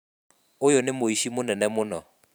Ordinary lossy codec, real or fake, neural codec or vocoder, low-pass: none; fake; vocoder, 44.1 kHz, 128 mel bands every 512 samples, BigVGAN v2; none